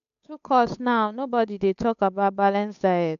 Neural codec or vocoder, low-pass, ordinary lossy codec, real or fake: codec, 16 kHz, 8 kbps, FunCodec, trained on Chinese and English, 25 frames a second; 7.2 kHz; none; fake